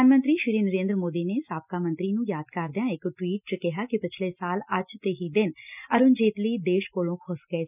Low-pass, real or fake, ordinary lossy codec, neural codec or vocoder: 3.6 kHz; real; none; none